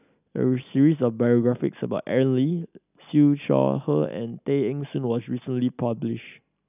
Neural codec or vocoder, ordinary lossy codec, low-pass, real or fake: none; none; 3.6 kHz; real